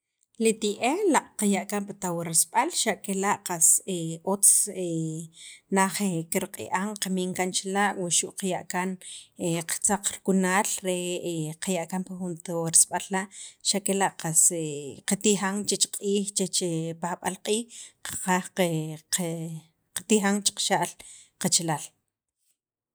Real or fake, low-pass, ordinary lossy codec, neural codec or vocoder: real; none; none; none